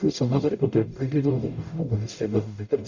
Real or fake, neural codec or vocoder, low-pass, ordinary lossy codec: fake; codec, 44.1 kHz, 0.9 kbps, DAC; 7.2 kHz; Opus, 64 kbps